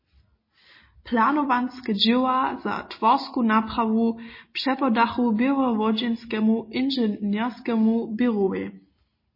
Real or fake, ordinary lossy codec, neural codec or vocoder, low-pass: real; MP3, 24 kbps; none; 5.4 kHz